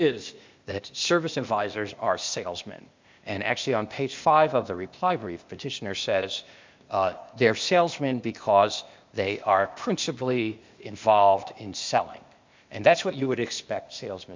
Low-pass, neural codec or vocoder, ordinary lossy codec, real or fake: 7.2 kHz; codec, 16 kHz, 0.8 kbps, ZipCodec; MP3, 64 kbps; fake